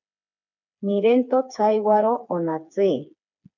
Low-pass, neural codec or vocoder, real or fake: 7.2 kHz; codec, 16 kHz, 4 kbps, FreqCodec, smaller model; fake